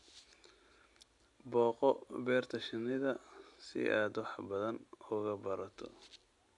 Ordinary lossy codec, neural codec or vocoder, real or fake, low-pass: AAC, 64 kbps; none; real; 10.8 kHz